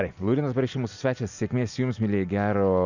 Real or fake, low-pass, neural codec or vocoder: real; 7.2 kHz; none